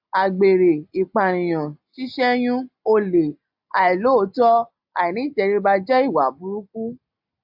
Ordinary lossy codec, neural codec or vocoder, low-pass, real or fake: AAC, 48 kbps; none; 5.4 kHz; real